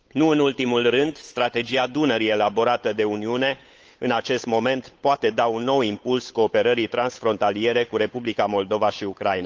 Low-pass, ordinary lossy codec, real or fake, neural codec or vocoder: 7.2 kHz; Opus, 24 kbps; fake; codec, 16 kHz, 8 kbps, FunCodec, trained on Chinese and English, 25 frames a second